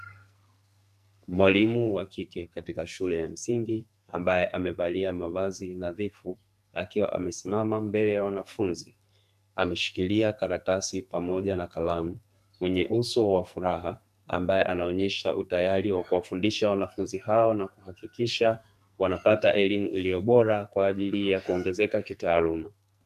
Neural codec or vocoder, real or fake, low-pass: codec, 44.1 kHz, 2.6 kbps, SNAC; fake; 14.4 kHz